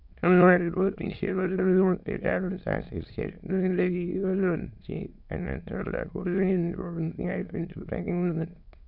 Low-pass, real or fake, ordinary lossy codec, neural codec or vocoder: 5.4 kHz; fake; none; autoencoder, 22.05 kHz, a latent of 192 numbers a frame, VITS, trained on many speakers